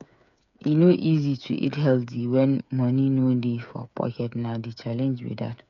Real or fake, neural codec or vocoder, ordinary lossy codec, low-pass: fake; codec, 16 kHz, 8 kbps, FreqCodec, smaller model; MP3, 96 kbps; 7.2 kHz